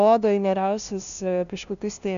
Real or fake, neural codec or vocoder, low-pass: fake; codec, 16 kHz, 0.5 kbps, FunCodec, trained on LibriTTS, 25 frames a second; 7.2 kHz